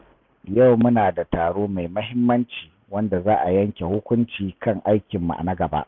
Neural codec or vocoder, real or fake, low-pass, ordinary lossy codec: none; real; 7.2 kHz; none